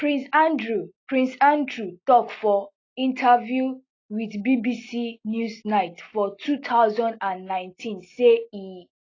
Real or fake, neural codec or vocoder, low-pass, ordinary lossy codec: real; none; 7.2 kHz; AAC, 32 kbps